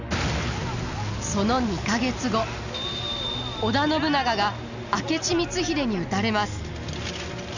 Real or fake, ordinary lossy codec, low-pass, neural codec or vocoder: real; none; 7.2 kHz; none